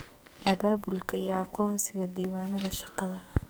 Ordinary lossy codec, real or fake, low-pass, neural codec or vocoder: none; fake; none; codec, 44.1 kHz, 2.6 kbps, SNAC